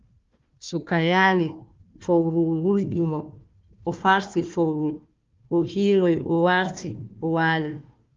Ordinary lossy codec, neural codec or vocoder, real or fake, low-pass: Opus, 32 kbps; codec, 16 kHz, 1 kbps, FunCodec, trained on Chinese and English, 50 frames a second; fake; 7.2 kHz